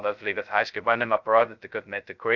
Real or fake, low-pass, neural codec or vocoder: fake; 7.2 kHz; codec, 16 kHz, 0.2 kbps, FocalCodec